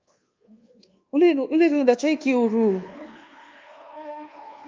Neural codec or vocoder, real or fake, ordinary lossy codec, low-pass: codec, 24 kHz, 1.2 kbps, DualCodec; fake; Opus, 24 kbps; 7.2 kHz